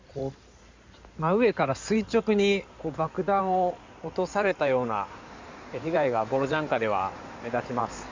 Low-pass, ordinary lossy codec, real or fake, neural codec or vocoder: 7.2 kHz; MP3, 64 kbps; fake; codec, 16 kHz in and 24 kHz out, 2.2 kbps, FireRedTTS-2 codec